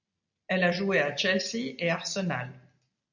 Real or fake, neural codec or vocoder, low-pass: real; none; 7.2 kHz